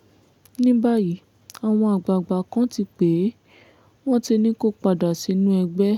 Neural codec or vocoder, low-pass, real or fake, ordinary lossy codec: none; 19.8 kHz; real; none